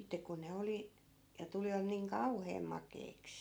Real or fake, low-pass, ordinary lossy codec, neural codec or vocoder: real; none; none; none